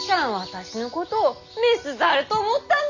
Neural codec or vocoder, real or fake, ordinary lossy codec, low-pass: none; real; none; 7.2 kHz